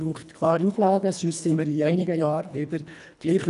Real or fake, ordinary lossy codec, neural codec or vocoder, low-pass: fake; none; codec, 24 kHz, 1.5 kbps, HILCodec; 10.8 kHz